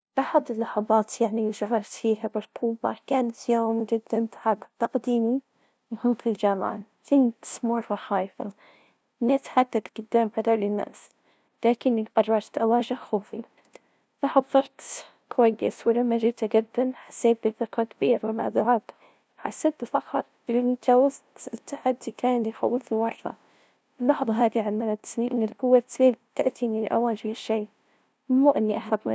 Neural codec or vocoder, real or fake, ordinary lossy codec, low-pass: codec, 16 kHz, 0.5 kbps, FunCodec, trained on LibriTTS, 25 frames a second; fake; none; none